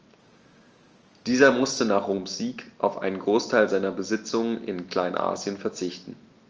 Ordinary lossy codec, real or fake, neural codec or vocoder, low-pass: Opus, 24 kbps; real; none; 7.2 kHz